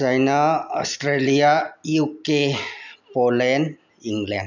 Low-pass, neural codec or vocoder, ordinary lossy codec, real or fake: 7.2 kHz; none; none; real